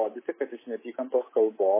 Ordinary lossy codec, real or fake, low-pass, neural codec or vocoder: MP3, 16 kbps; fake; 3.6 kHz; codec, 24 kHz, 3.1 kbps, DualCodec